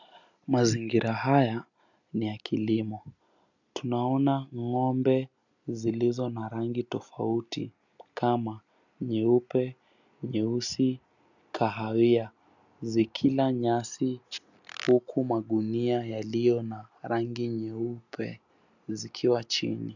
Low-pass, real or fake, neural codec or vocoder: 7.2 kHz; real; none